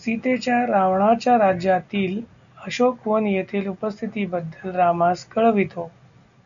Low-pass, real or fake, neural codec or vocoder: 7.2 kHz; real; none